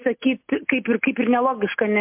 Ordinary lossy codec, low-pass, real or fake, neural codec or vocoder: MP3, 32 kbps; 3.6 kHz; real; none